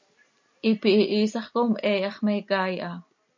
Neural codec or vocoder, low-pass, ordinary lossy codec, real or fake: none; 7.2 kHz; MP3, 48 kbps; real